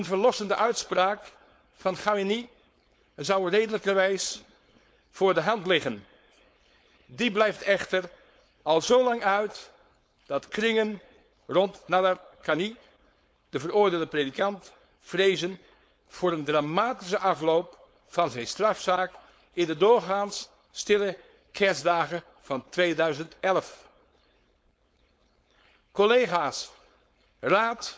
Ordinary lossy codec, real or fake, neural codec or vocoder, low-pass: none; fake; codec, 16 kHz, 4.8 kbps, FACodec; none